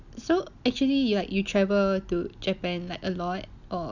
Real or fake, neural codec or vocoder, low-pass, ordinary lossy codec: real; none; 7.2 kHz; none